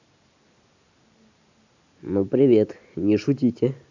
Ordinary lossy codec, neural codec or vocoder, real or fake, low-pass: AAC, 48 kbps; none; real; 7.2 kHz